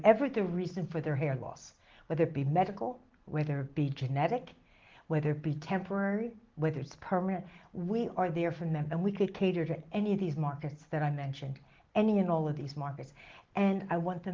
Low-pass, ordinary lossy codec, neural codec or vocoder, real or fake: 7.2 kHz; Opus, 16 kbps; none; real